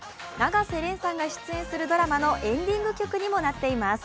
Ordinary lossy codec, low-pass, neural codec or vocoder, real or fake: none; none; none; real